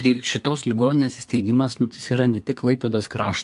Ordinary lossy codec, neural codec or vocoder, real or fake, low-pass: AAC, 64 kbps; codec, 24 kHz, 1 kbps, SNAC; fake; 10.8 kHz